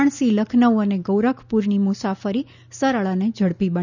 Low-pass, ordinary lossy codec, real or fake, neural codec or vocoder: 7.2 kHz; none; real; none